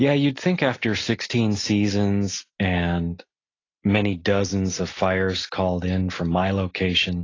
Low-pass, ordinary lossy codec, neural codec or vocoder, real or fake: 7.2 kHz; AAC, 32 kbps; none; real